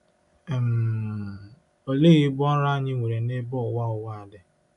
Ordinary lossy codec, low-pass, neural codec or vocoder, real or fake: none; 10.8 kHz; none; real